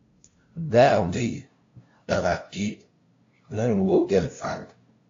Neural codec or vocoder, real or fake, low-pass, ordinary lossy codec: codec, 16 kHz, 0.5 kbps, FunCodec, trained on LibriTTS, 25 frames a second; fake; 7.2 kHz; AAC, 48 kbps